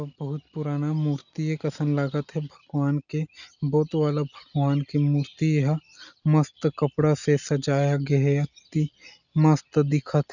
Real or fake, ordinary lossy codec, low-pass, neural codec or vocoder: real; none; 7.2 kHz; none